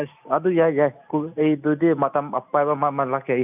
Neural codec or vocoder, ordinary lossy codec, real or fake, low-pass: none; none; real; 3.6 kHz